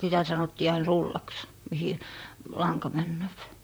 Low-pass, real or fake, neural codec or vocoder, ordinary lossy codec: none; fake; vocoder, 44.1 kHz, 128 mel bands, Pupu-Vocoder; none